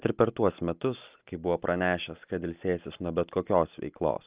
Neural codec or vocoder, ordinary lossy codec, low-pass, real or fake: none; Opus, 32 kbps; 3.6 kHz; real